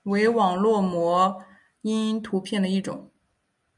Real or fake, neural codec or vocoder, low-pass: real; none; 10.8 kHz